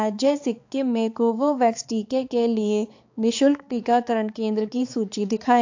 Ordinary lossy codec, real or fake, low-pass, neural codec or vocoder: AAC, 48 kbps; fake; 7.2 kHz; codec, 16 kHz, 4 kbps, X-Codec, HuBERT features, trained on balanced general audio